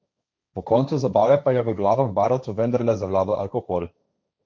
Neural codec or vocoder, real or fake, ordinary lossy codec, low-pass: codec, 16 kHz, 1.1 kbps, Voila-Tokenizer; fake; none; none